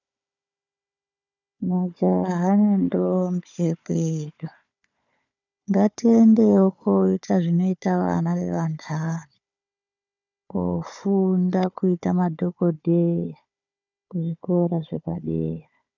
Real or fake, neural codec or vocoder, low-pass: fake; codec, 16 kHz, 4 kbps, FunCodec, trained on Chinese and English, 50 frames a second; 7.2 kHz